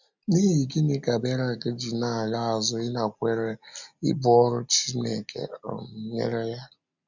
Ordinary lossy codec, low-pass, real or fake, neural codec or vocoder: none; 7.2 kHz; real; none